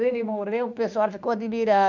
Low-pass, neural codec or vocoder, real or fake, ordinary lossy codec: 7.2 kHz; autoencoder, 48 kHz, 32 numbers a frame, DAC-VAE, trained on Japanese speech; fake; none